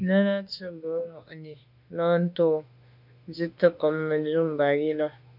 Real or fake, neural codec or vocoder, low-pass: fake; autoencoder, 48 kHz, 32 numbers a frame, DAC-VAE, trained on Japanese speech; 5.4 kHz